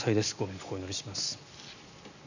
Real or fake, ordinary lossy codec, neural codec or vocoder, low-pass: real; none; none; 7.2 kHz